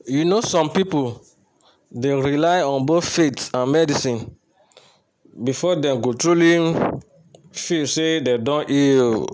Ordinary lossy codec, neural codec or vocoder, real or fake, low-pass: none; none; real; none